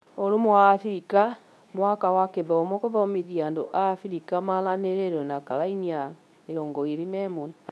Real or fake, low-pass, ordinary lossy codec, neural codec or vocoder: fake; none; none; codec, 24 kHz, 0.9 kbps, WavTokenizer, medium speech release version 2